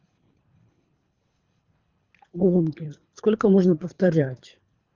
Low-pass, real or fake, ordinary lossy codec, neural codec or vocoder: 7.2 kHz; fake; Opus, 32 kbps; codec, 24 kHz, 3 kbps, HILCodec